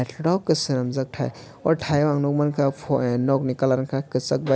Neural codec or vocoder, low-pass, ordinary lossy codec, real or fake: none; none; none; real